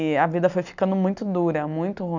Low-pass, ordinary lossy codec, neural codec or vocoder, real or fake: 7.2 kHz; none; none; real